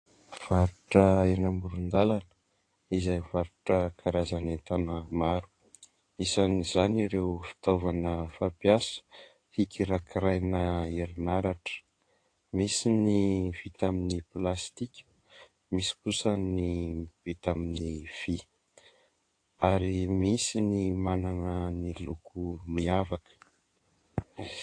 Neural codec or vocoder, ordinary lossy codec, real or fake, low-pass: codec, 16 kHz in and 24 kHz out, 2.2 kbps, FireRedTTS-2 codec; AAC, 48 kbps; fake; 9.9 kHz